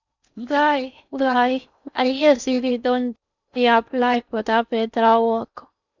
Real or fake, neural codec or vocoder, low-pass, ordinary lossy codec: fake; codec, 16 kHz in and 24 kHz out, 0.6 kbps, FocalCodec, streaming, 4096 codes; 7.2 kHz; none